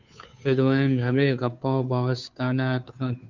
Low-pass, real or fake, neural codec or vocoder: 7.2 kHz; fake; codec, 16 kHz, 2 kbps, FunCodec, trained on Chinese and English, 25 frames a second